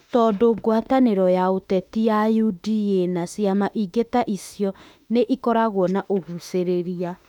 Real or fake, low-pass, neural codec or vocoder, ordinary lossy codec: fake; 19.8 kHz; autoencoder, 48 kHz, 32 numbers a frame, DAC-VAE, trained on Japanese speech; none